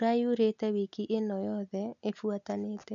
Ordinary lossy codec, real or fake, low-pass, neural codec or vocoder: none; real; 7.2 kHz; none